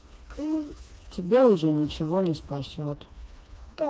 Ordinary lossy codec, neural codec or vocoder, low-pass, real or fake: none; codec, 16 kHz, 2 kbps, FreqCodec, smaller model; none; fake